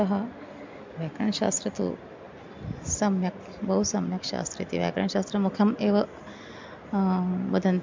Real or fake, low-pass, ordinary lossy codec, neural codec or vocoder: real; 7.2 kHz; MP3, 64 kbps; none